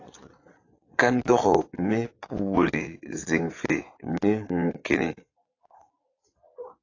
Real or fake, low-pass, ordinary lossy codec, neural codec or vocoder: fake; 7.2 kHz; AAC, 32 kbps; vocoder, 22.05 kHz, 80 mel bands, Vocos